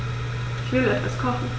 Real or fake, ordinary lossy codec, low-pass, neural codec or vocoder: real; none; none; none